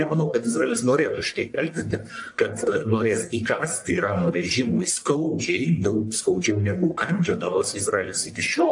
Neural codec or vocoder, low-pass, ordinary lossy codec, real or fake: codec, 44.1 kHz, 1.7 kbps, Pupu-Codec; 10.8 kHz; AAC, 64 kbps; fake